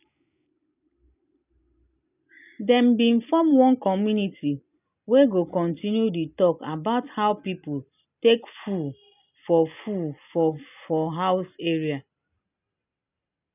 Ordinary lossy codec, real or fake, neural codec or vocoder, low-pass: none; real; none; 3.6 kHz